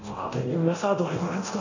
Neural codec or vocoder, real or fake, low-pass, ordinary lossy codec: codec, 24 kHz, 0.9 kbps, DualCodec; fake; 7.2 kHz; none